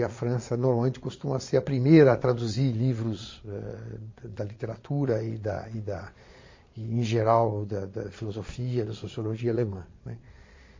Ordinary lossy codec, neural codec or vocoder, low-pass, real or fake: MP3, 32 kbps; vocoder, 22.05 kHz, 80 mel bands, Vocos; 7.2 kHz; fake